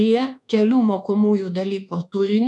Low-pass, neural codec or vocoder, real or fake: 10.8 kHz; codec, 24 kHz, 1.2 kbps, DualCodec; fake